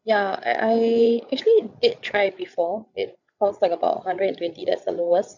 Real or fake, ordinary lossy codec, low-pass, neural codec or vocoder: fake; none; 7.2 kHz; codec, 16 kHz, 8 kbps, FreqCodec, larger model